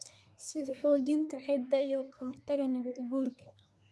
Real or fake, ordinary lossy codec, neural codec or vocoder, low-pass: fake; none; codec, 24 kHz, 1 kbps, SNAC; none